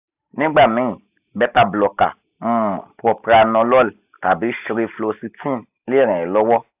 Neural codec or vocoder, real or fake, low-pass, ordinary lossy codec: none; real; 3.6 kHz; AAC, 32 kbps